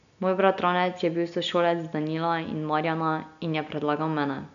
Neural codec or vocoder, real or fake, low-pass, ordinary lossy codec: none; real; 7.2 kHz; none